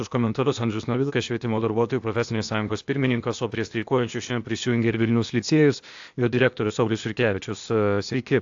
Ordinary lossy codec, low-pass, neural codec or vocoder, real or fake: AAC, 64 kbps; 7.2 kHz; codec, 16 kHz, 0.8 kbps, ZipCodec; fake